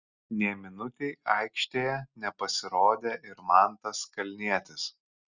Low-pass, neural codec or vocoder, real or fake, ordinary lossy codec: 7.2 kHz; none; real; Opus, 64 kbps